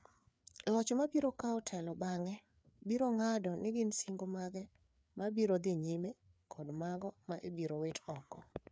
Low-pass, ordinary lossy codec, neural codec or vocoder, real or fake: none; none; codec, 16 kHz, 4 kbps, FreqCodec, larger model; fake